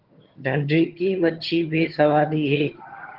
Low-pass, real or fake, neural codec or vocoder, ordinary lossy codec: 5.4 kHz; fake; vocoder, 22.05 kHz, 80 mel bands, HiFi-GAN; Opus, 16 kbps